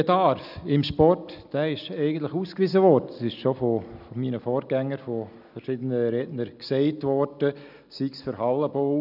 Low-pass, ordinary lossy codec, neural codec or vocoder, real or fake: 5.4 kHz; none; none; real